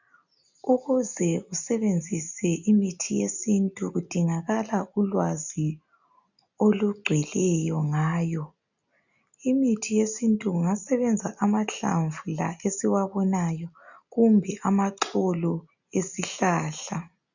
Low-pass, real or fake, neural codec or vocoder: 7.2 kHz; real; none